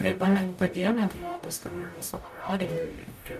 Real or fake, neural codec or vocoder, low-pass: fake; codec, 44.1 kHz, 0.9 kbps, DAC; 14.4 kHz